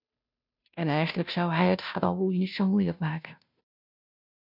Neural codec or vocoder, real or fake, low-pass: codec, 16 kHz, 0.5 kbps, FunCodec, trained on Chinese and English, 25 frames a second; fake; 5.4 kHz